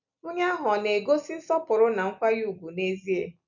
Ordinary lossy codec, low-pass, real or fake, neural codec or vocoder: none; 7.2 kHz; real; none